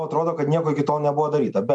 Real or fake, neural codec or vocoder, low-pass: real; none; 10.8 kHz